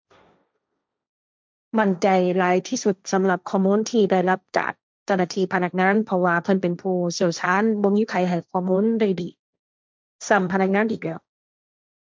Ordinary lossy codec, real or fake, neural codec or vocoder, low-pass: none; fake; codec, 16 kHz, 1.1 kbps, Voila-Tokenizer; none